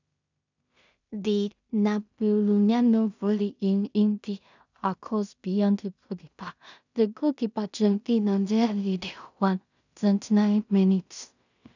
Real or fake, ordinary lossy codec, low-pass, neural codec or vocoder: fake; none; 7.2 kHz; codec, 16 kHz in and 24 kHz out, 0.4 kbps, LongCat-Audio-Codec, two codebook decoder